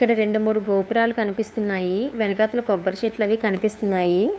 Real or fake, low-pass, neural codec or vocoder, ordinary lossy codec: fake; none; codec, 16 kHz, 2 kbps, FunCodec, trained on LibriTTS, 25 frames a second; none